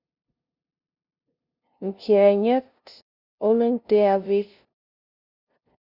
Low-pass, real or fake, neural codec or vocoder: 5.4 kHz; fake; codec, 16 kHz, 0.5 kbps, FunCodec, trained on LibriTTS, 25 frames a second